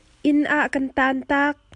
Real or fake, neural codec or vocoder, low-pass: real; none; 10.8 kHz